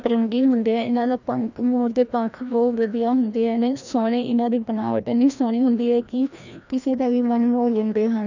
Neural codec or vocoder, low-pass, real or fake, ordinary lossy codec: codec, 16 kHz, 1 kbps, FreqCodec, larger model; 7.2 kHz; fake; none